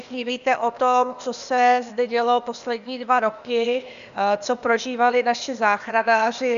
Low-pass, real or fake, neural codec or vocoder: 7.2 kHz; fake; codec, 16 kHz, 0.8 kbps, ZipCodec